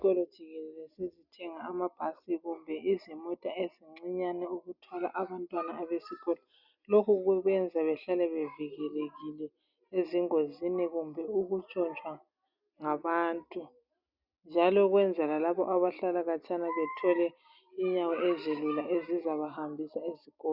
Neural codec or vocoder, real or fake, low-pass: none; real; 5.4 kHz